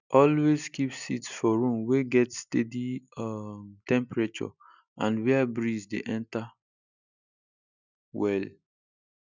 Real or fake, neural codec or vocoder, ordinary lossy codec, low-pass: real; none; none; 7.2 kHz